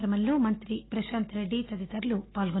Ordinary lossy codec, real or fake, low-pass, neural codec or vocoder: AAC, 16 kbps; real; 7.2 kHz; none